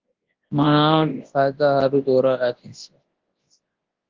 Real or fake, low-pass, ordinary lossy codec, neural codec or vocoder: fake; 7.2 kHz; Opus, 16 kbps; codec, 24 kHz, 0.9 kbps, WavTokenizer, large speech release